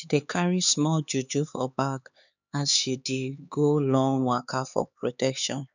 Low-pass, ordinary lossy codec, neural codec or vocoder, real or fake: 7.2 kHz; none; codec, 16 kHz, 4 kbps, X-Codec, HuBERT features, trained on LibriSpeech; fake